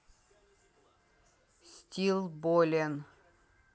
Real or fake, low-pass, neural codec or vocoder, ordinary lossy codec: real; none; none; none